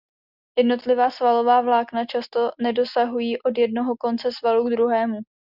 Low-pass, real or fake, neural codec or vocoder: 5.4 kHz; real; none